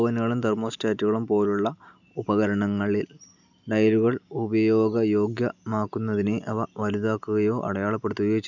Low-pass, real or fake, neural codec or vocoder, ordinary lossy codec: 7.2 kHz; real; none; none